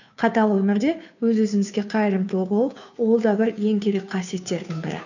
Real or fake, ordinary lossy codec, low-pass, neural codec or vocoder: fake; none; 7.2 kHz; codec, 16 kHz, 2 kbps, FunCodec, trained on Chinese and English, 25 frames a second